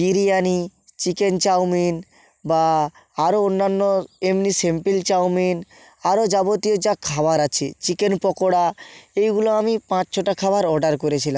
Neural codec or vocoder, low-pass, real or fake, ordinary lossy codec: none; none; real; none